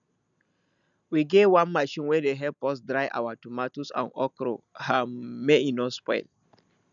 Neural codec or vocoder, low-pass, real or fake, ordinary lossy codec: none; 7.2 kHz; real; none